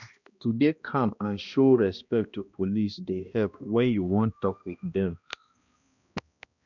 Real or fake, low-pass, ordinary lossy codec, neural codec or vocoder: fake; 7.2 kHz; none; codec, 16 kHz, 1 kbps, X-Codec, HuBERT features, trained on balanced general audio